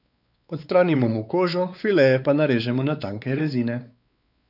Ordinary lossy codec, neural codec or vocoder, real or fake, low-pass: none; codec, 16 kHz, 4 kbps, X-Codec, WavLM features, trained on Multilingual LibriSpeech; fake; 5.4 kHz